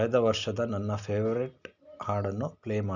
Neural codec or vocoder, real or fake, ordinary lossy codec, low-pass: none; real; none; 7.2 kHz